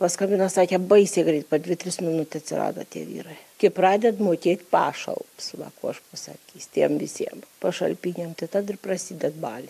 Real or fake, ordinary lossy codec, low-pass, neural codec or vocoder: real; AAC, 96 kbps; 14.4 kHz; none